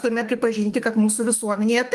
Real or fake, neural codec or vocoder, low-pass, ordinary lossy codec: fake; autoencoder, 48 kHz, 32 numbers a frame, DAC-VAE, trained on Japanese speech; 14.4 kHz; Opus, 24 kbps